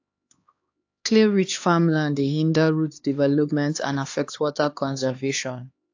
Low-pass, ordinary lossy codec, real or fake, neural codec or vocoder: 7.2 kHz; AAC, 48 kbps; fake; codec, 16 kHz, 2 kbps, X-Codec, HuBERT features, trained on LibriSpeech